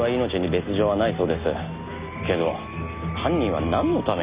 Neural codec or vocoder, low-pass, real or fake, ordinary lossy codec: none; 3.6 kHz; real; Opus, 64 kbps